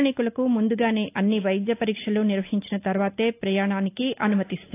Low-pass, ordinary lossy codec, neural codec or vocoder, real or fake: 3.6 kHz; AAC, 24 kbps; none; real